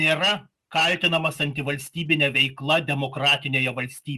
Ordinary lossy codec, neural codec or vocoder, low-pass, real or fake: Opus, 32 kbps; none; 14.4 kHz; real